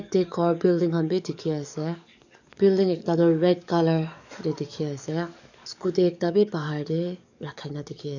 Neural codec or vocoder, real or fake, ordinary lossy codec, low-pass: codec, 44.1 kHz, 7.8 kbps, DAC; fake; none; 7.2 kHz